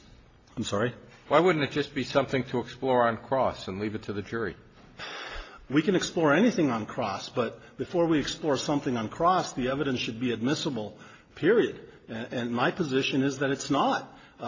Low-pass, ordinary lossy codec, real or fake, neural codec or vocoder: 7.2 kHz; AAC, 32 kbps; real; none